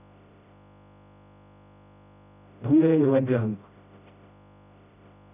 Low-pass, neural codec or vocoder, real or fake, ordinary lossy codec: 3.6 kHz; codec, 16 kHz, 0.5 kbps, FreqCodec, smaller model; fake; none